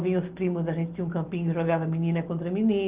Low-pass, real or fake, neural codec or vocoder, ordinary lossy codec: 3.6 kHz; real; none; Opus, 32 kbps